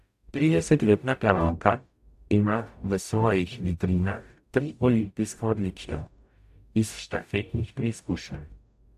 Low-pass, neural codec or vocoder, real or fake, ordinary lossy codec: 14.4 kHz; codec, 44.1 kHz, 0.9 kbps, DAC; fake; AAC, 96 kbps